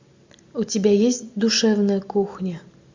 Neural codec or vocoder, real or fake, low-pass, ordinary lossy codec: none; real; 7.2 kHz; MP3, 64 kbps